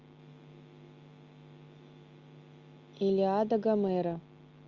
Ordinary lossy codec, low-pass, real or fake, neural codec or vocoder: Opus, 32 kbps; 7.2 kHz; real; none